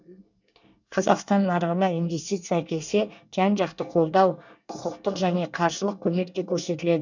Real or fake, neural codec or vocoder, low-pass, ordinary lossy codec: fake; codec, 24 kHz, 1 kbps, SNAC; 7.2 kHz; none